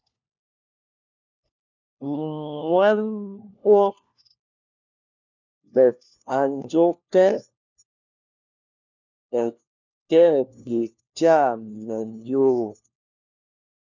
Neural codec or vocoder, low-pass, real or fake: codec, 16 kHz, 1 kbps, FunCodec, trained on LibriTTS, 50 frames a second; 7.2 kHz; fake